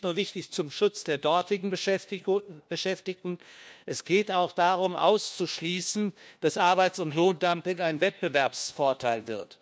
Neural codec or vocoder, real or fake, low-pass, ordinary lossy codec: codec, 16 kHz, 1 kbps, FunCodec, trained on LibriTTS, 50 frames a second; fake; none; none